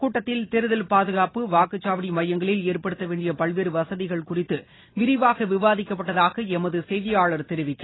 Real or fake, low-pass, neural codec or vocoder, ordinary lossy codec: real; 7.2 kHz; none; AAC, 16 kbps